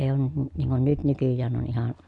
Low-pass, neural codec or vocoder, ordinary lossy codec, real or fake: 10.8 kHz; none; none; real